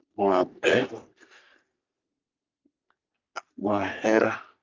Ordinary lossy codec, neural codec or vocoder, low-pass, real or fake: Opus, 32 kbps; codec, 44.1 kHz, 3.4 kbps, Pupu-Codec; 7.2 kHz; fake